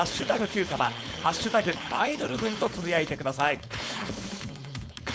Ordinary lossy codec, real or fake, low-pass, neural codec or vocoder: none; fake; none; codec, 16 kHz, 4.8 kbps, FACodec